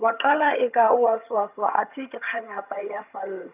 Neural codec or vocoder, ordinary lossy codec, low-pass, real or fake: vocoder, 22.05 kHz, 80 mel bands, HiFi-GAN; none; 3.6 kHz; fake